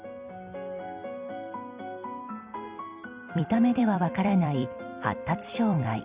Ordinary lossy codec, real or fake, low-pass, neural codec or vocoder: Opus, 64 kbps; fake; 3.6 kHz; vocoder, 44.1 kHz, 128 mel bands every 256 samples, BigVGAN v2